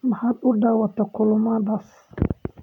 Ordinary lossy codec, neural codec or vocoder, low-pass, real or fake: none; none; 19.8 kHz; real